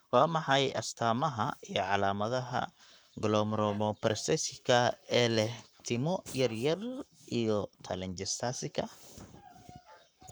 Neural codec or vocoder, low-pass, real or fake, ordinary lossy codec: codec, 44.1 kHz, 7.8 kbps, Pupu-Codec; none; fake; none